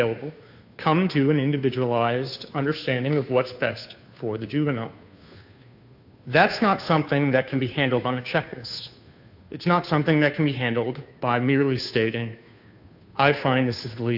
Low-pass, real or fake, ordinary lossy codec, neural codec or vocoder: 5.4 kHz; fake; AAC, 48 kbps; codec, 16 kHz, 2 kbps, FunCodec, trained on Chinese and English, 25 frames a second